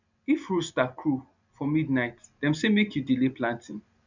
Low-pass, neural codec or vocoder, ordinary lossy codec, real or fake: 7.2 kHz; none; none; real